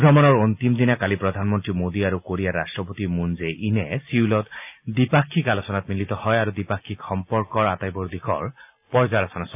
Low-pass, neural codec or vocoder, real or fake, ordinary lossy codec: 3.6 kHz; none; real; AAC, 32 kbps